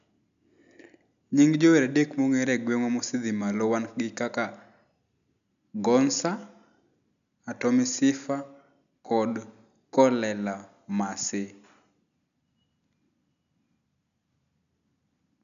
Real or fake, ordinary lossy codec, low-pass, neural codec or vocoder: real; none; 7.2 kHz; none